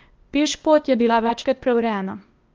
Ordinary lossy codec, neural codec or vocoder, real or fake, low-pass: Opus, 24 kbps; codec, 16 kHz, 0.8 kbps, ZipCodec; fake; 7.2 kHz